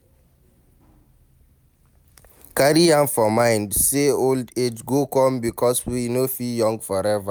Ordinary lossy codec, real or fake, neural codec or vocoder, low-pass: none; real; none; none